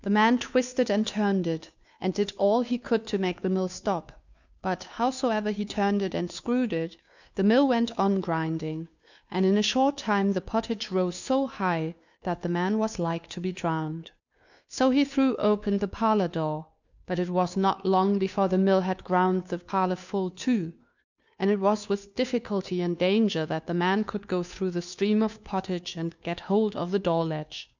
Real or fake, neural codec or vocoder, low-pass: fake; codec, 16 kHz, 2 kbps, FunCodec, trained on Chinese and English, 25 frames a second; 7.2 kHz